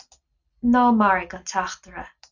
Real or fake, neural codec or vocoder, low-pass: real; none; 7.2 kHz